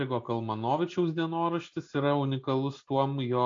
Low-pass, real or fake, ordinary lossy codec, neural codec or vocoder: 7.2 kHz; real; AAC, 48 kbps; none